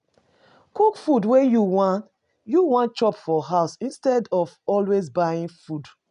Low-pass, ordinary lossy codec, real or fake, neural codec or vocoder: 10.8 kHz; none; real; none